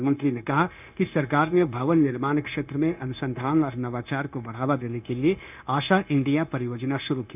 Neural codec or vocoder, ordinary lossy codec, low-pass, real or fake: codec, 16 kHz, 0.9 kbps, LongCat-Audio-Codec; none; 3.6 kHz; fake